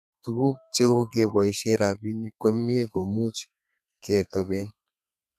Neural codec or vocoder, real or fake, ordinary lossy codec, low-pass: codec, 32 kHz, 1.9 kbps, SNAC; fake; none; 14.4 kHz